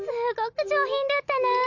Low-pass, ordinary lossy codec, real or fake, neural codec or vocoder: 7.2 kHz; Opus, 64 kbps; real; none